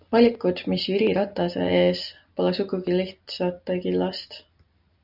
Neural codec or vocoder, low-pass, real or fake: none; 5.4 kHz; real